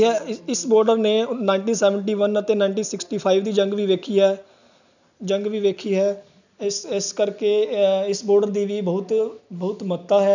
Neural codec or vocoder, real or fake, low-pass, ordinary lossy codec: none; real; 7.2 kHz; none